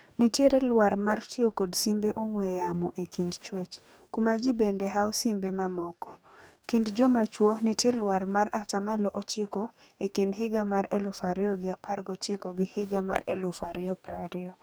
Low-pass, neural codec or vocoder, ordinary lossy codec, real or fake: none; codec, 44.1 kHz, 2.6 kbps, DAC; none; fake